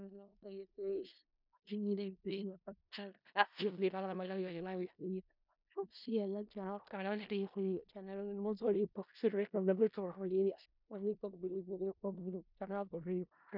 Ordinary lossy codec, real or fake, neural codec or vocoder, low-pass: none; fake; codec, 16 kHz in and 24 kHz out, 0.4 kbps, LongCat-Audio-Codec, four codebook decoder; 5.4 kHz